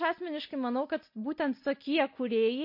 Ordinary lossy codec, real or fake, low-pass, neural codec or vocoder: MP3, 32 kbps; real; 5.4 kHz; none